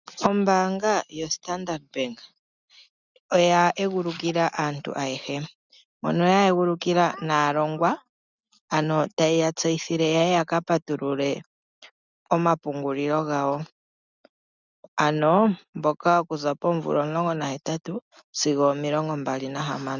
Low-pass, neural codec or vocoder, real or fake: 7.2 kHz; none; real